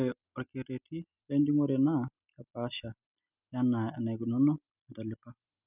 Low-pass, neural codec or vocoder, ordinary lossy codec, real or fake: 3.6 kHz; none; none; real